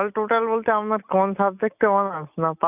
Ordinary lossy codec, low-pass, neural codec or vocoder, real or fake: none; 3.6 kHz; none; real